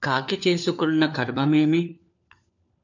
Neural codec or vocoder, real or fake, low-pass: codec, 16 kHz, 4 kbps, FunCodec, trained on LibriTTS, 50 frames a second; fake; 7.2 kHz